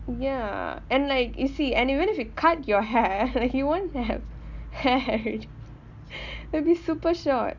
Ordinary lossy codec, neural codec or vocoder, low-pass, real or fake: none; none; 7.2 kHz; real